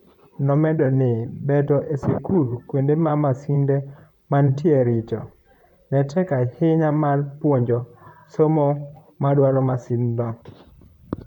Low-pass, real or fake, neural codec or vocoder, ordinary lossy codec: 19.8 kHz; fake; vocoder, 44.1 kHz, 128 mel bands, Pupu-Vocoder; none